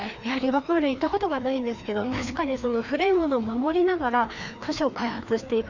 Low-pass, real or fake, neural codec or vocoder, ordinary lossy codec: 7.2 kHz; fake; codec, 16 kHz, 2 kbps, FreqCodec, larger model; none